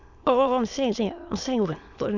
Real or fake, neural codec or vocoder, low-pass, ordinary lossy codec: fake; autoencoder, 22.05 kHz, a latent of 192 numbers a frame, VITS, trained on many speakers; 7.2 kHz; none